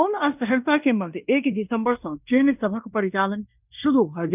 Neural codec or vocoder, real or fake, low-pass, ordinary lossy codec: codec, 16 kHz in and 24 kHz out, 0.9 kbps, LongCat-Audio-Codec, fine tuned four codebook decoder; fake; 3.6 kHz; none